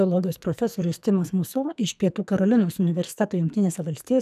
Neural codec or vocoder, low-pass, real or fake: codec, 44.1 kHz, 2.6 kbps, SNAC; 14.4 kHz; fake